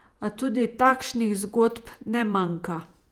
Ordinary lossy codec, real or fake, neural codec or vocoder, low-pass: Opus, 32 kbps; fake; vocoder, 48 kHz, 128 mel bands, Vocos; 19.8 kHz